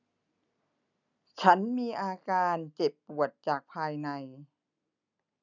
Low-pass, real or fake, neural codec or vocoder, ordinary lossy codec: 7.2 kHz; real; none; none